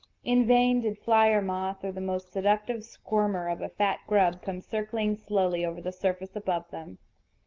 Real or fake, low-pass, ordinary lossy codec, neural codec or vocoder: real; 7.2 kHz; Opus, 24 kbps; none